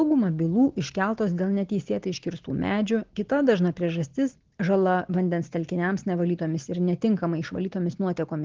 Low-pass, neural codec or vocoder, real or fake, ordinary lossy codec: 7.2 kHz; none; real; Opus, 16 kbps